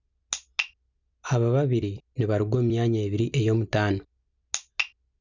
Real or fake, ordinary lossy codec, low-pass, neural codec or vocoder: real; none; 7.2 kHz; none